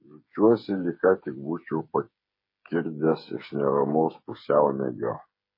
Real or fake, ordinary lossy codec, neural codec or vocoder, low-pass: fake; MP3, 24 kbps; codec, 16 kHz, 8 kbps, FreqCodec, smaller model; 5.4 kHz